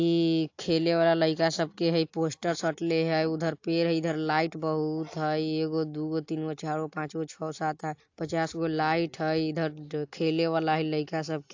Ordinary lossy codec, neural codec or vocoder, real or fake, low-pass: AAC, 48 kbps; none; real; 7.2 kHz